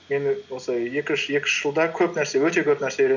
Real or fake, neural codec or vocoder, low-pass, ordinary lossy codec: real; none; 7.2 kHz; none